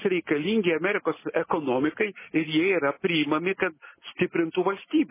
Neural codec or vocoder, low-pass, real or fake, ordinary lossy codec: none; 3.6 kHz; real; MP3, 16 kbps